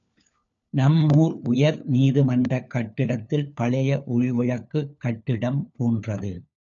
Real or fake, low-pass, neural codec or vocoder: fake; 7.2 kHz; codec, 16 kHz, 4 kbps, FunCodec, trained on LibriTTS, 50 frames a second